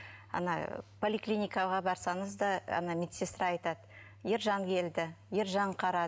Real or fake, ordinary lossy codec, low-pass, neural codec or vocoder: real; none; none; none